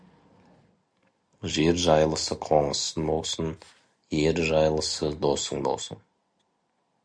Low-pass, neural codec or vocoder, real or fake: 9.9 kHz; none; real